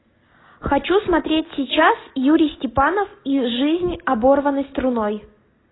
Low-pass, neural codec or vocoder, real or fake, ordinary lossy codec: 7.2 kHz; none; real; AAC, 16 kbps